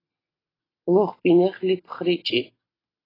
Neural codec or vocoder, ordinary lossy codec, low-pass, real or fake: none; AAC, 24 kbps; 5.4 kHz; real